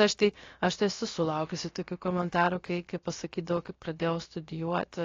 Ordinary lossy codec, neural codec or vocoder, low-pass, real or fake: AAC, 32 kbps; codec, 16 kHz, about 1 kbps, DyCAST, with the encoder's durations; 7.2 kHz; fake